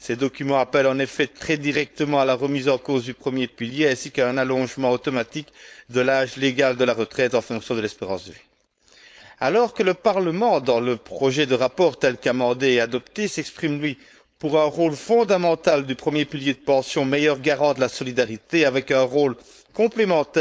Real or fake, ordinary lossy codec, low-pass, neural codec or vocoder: fake; none; none; codec, 16 kHz, 4.8 kbps, FACodec